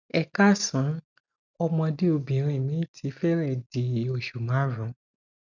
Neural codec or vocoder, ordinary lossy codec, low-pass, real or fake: none; none; 7.2 kHz; real